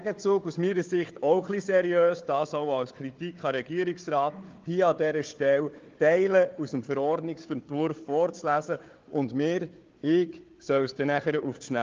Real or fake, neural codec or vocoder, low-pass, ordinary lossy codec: fake; codec, 16 kHz, 4 kbps, FunCodec, trained on Chinese and English, 50 frames a second; 7.2 kHz; Opus, 24 kbps